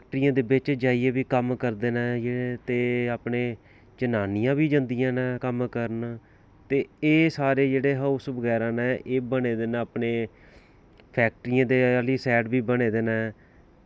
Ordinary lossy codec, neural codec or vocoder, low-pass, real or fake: none; none; none; real